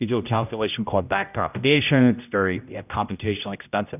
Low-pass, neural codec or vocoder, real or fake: 3.6 kHz; codec, 16 kHz, 0.5 kbps, X-Codec, HuBERT features, trained on general audio; fake